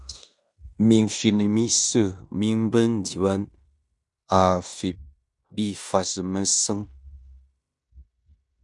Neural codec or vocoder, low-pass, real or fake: codec, 16 kHz in and 24 kHz out, 0.9 kbps, LongCat-Audio-Codec, fine tuned four codebook decoder; 10.8 kHz; fake